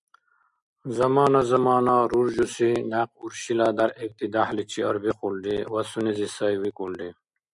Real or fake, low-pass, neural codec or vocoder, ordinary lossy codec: real; 10.8 kHz; none; MP3, 96 kbps